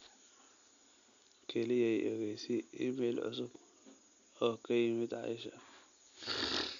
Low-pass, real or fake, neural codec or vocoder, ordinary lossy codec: 7.2 kHz; real; none; none